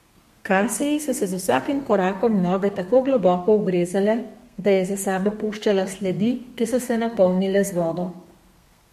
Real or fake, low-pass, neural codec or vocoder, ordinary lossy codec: fake; 14.4 kHz; codec, 32 kHz, 1.9 kbps, SNAC; MP3, 64 kbps